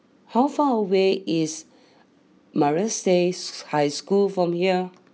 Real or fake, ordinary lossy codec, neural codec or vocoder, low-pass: real; none; none; none